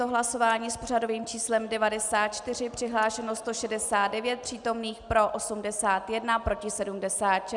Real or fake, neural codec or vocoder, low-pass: real; none; 10.8 kHz